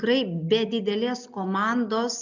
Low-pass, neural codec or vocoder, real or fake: 7.2 kHz; none; real